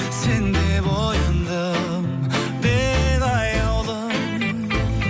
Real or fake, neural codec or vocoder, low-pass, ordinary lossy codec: real; none; none; none